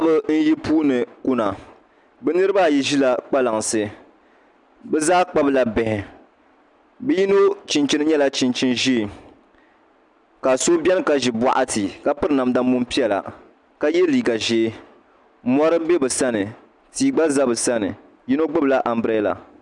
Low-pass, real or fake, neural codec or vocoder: 10.8 kHz; real; none